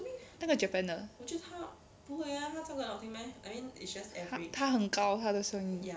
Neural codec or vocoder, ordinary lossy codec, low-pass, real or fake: none; none; none; real